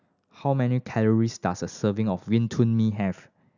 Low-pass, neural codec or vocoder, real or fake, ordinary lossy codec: 7.2 kHz; none; real; none